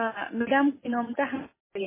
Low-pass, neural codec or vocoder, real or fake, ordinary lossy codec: 3.6 kHz; none; real; MP3, 16 kbps